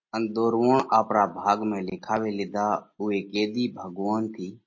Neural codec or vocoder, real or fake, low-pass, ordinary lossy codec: none; real; 7.2 kHz; MP3, 32 kbps